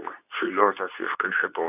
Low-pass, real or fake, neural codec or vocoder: 3.6 kHz; fake; codec, 24 kHz, 0.9 kbps, WavTokenizer, medium speech release version 2